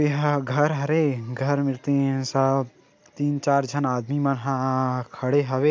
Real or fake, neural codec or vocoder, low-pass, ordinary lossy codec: real; none; none; none